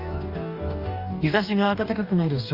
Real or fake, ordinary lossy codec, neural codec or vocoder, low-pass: fake; Opus, 64 kbps; codec, 44.1 kHz, 2.6 kbps, DAC; 5.4 kHz